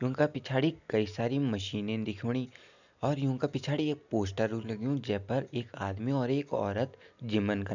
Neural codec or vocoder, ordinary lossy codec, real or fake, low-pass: none; none; real; 7.2 kHz